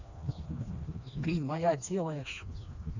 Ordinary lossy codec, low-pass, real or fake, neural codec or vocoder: none; 7.2 kHz; fake; codec, 16 kHz, 2 kbps, FreqCodec, smaller model